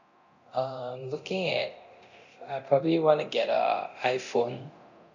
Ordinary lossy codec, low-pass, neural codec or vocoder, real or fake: none; 7.2 kHz; codec, 24 kHz, 0.9 kbps, DualCodec; fake